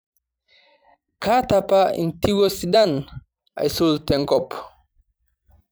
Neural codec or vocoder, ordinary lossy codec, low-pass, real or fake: vocoder, 44.1 kHz, 128 mel bands every 256 samples, BigVGAN v2; none; none; fake